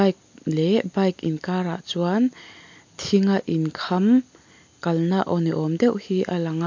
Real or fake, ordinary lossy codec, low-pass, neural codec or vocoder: real; MP3, 48 kbps; 7.2 kHz; none